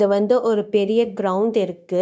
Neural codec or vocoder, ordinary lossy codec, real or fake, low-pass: codec, 16 kHz, 0.9 kbps, LongCat-Audio-Codec; none; fake; none